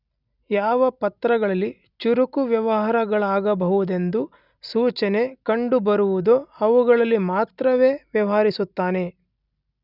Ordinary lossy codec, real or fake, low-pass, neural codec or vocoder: none; real; 5.4 kHz; none